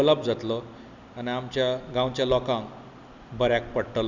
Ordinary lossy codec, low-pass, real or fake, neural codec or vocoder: none; 7.2 kHz; real; none